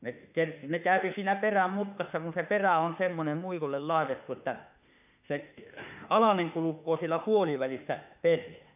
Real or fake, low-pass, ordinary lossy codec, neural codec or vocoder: fake; 3.6 kHz; none; autoencoder, 48 kHz, 32 numbers a frame, DAC-VAE, trained on Japanese speech